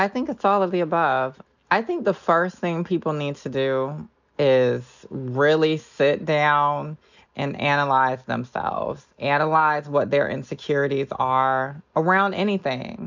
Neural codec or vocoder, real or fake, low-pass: none; real; 7.2 kHz